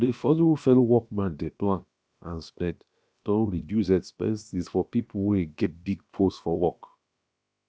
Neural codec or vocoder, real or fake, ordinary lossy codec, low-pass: codec, 16 kHz, about 1 kbps, DyCAST, with the encoder's durations; fake; none; none